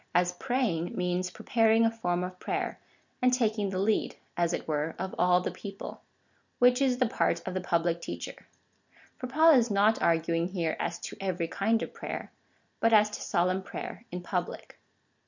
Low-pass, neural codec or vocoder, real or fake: 7.2 kHz; none; real